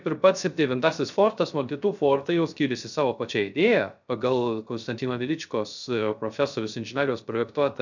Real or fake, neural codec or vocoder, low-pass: fake; codec, 16 kHz, 0.3 kbps, FocalCodec; 7.2 kHz